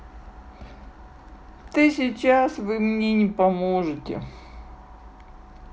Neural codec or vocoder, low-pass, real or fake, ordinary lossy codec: none; none; real; none